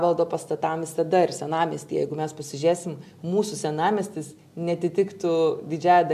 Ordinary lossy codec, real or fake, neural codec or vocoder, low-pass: AAC, 96 kbps; real; none; 14.4 kHz